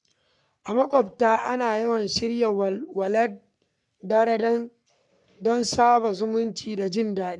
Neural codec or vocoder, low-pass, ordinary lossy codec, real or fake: codec, 44.1 kHz, 3.4 kbps, Pupu-Codec; 10.8 kHz; none; fake